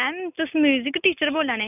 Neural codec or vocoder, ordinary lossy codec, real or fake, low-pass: none; none; real; 3.6 kHz